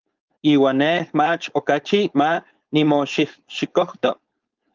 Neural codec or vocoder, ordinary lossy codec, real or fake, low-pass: codec, 16 kHz, 4.8 kbps, FACodec; Opus, 24 kbps; fake; 7.2 kHz